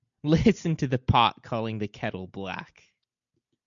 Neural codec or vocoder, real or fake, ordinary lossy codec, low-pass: none; real; MP3, 64 kbps; 7.2 kHz